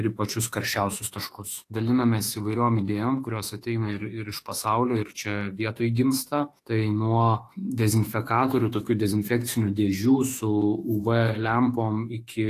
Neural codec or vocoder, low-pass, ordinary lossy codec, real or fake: autoencoder, 48 kHz, 32 numbers a frame, DAC-VAE, trained on Japanese speech; 14.4 kHz; AAC, 48 kbps; fake